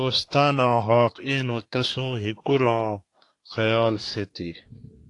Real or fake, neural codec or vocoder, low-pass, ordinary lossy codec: fake; codec, 24 kHz, 1 kbps, SNAC; 10.8 kHz; AAC, 48 kbps